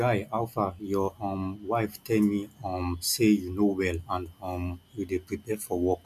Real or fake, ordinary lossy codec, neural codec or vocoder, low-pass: real; none; none; 14.4 kHz